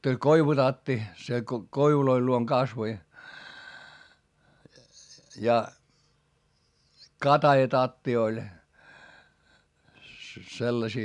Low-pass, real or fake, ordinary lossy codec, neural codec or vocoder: 10.8 kHz; real; none; none